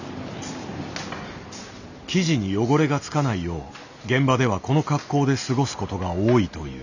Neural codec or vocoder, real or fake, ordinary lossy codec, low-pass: none; real; none; 7.2 kHz